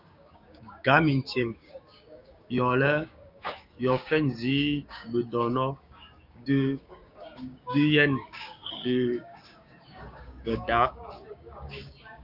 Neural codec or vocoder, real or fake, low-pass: codec, 16 kHz, 6 kbps, DAC; fake; 5.4 kHz